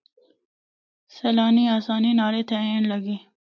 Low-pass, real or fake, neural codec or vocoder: 7.2 kHz; real; none